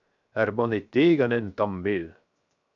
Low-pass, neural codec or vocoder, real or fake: 7.2 kHz; codec, 16 kHz, 0.7 kbps, FocalCodec; fake